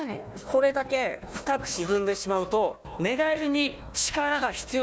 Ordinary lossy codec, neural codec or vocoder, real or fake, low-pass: none; codec, 16 kHz, 1 kbps, FunCodec, trained on Chinese and English, 50 frames a second; fake; none